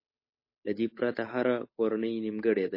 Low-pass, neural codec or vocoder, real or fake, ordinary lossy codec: 5.4 kHz; codec, 16 kHz, 8 kbps, FunCodec, trained on Chinese and English, 25 frames a second; fake; MP3, 24 kbps